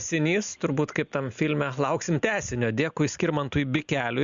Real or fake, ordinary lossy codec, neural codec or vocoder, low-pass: real; Opus, 64 kbps; none; 7.2 kHz